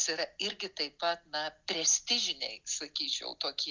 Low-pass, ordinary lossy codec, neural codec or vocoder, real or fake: 7.2 kHz; Opus, 24 kbps; none; real